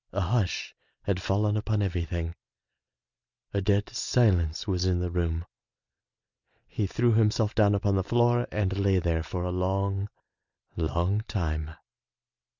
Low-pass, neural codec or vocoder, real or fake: 7.2 kHz; none; real